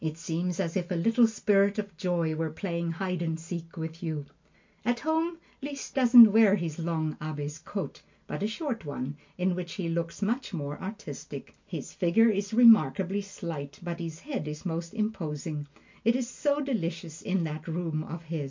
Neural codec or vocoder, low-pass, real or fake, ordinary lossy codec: none; 7.2 kHz; real; MP3, 48 kbps